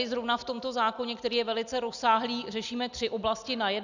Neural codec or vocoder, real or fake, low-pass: none; real; 7.2 kHz